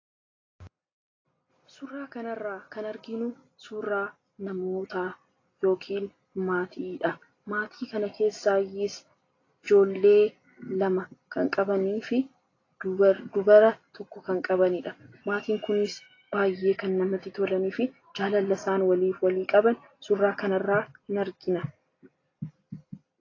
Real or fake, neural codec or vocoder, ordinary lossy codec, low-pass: real; none; AAC, 32 kbps; 7.2 kHz